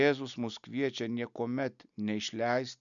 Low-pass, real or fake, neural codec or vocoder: 7.2 kHz; real; none